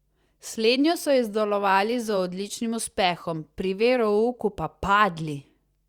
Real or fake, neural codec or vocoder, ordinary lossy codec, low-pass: fake; vocoder, 44.1 kHz, 128 mel bands every 512 samples, BigVGAN v2; Opus, 64 kbps; 19.8 kHz